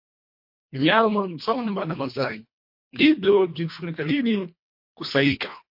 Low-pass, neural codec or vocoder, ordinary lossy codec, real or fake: 5.4 kHz; codec, 24 kHz, 1.5 kbps, HILCodec; MP3, 32 kbps; fake